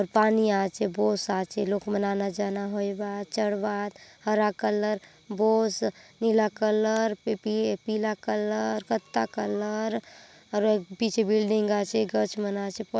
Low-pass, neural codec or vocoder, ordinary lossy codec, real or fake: none; none; none; real